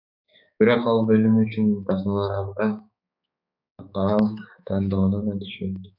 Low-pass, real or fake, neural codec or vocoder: 5.4 kHz; fake; codec, 16 kHz, 4 kbps, X-Codec, HuBERT features, trained on balanced general audio